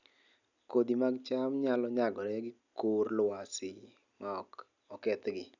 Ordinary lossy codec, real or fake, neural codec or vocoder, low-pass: none; real; none; 7.2 kHz